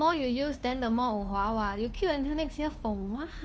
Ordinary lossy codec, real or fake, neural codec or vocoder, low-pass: none; fake; codec, 16 kHz, 2 kbps, FunCodec, trained on Chinese and English, 25 frames a second; none